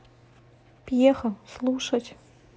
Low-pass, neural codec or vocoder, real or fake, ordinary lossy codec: none; none; real; none